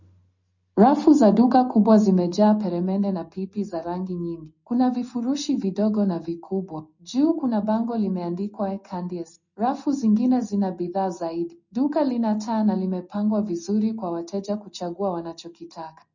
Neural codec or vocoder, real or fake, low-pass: none; real; 7.2 kHz